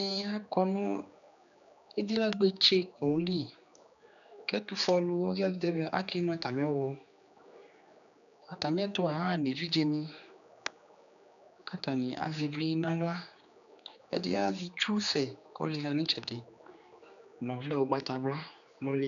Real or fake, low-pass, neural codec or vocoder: fake; 7.2 kHz; codec, 16 kHz, 2 kbps, X-Codec, HuBERT features, trained on general audio